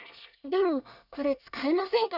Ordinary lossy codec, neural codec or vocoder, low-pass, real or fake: none; codec, 24 kHz, 1 kbps, SNAC; 5.4 kHz; fake